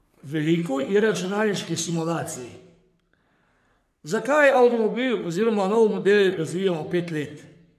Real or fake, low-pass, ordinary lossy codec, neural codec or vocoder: fake; 14.4 kHz; none; codec, 44.1 kHz, 3.4 kbps, Pupu-Codec